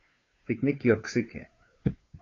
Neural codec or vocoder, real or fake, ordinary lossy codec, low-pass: codec, 16 kHz, 2 kbps, FunCodec, trained on Chinese and English, 25 frames a second; fake; AAC, 32 kbps; 7.2 kHz